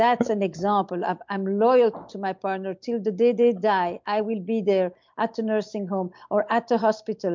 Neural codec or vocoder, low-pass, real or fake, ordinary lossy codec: none; 7.2 kHz; real; MP3, 64 kbps